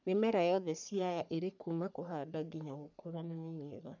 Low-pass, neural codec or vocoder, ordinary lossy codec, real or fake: 7.2 kHz; codec, 44.1 kHz, 3.4 kbps, Pupu-Codec; none; fake